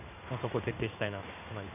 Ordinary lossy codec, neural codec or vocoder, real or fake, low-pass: none; codec, 16 kHz in and 24 kHz out, 1 kbps, XY-Tokenizer; fake; 3.6 kHz